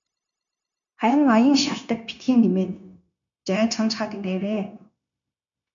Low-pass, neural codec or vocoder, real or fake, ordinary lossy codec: 7.2 kHz; codec, 16 kHz, 0.9 kbps, LongCat-Audio-Codec; fake; MP3, 96 kbps